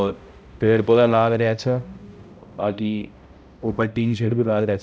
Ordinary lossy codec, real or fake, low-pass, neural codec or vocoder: none; fake; none; codec, 16 kHz, 0.5 kbps, X-Codec, HuBERT features, trained on balanced general audio